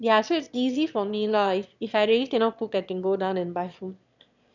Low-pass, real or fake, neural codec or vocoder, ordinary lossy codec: 7.2 kHz; fake; autoencoder, 22.05 kHz, a latent of 192 numbers a frame, VITS, trained on one speaker; none